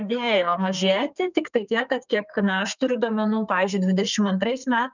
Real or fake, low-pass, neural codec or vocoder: fake; 7.2 kHz; codec, 32 kHz, 1.9 kbps, SNAC